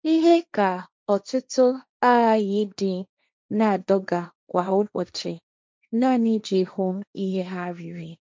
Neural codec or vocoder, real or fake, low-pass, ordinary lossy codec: codec, 16 kHz, 1.1 kbps, Voila-Tokenizer; fake; none; none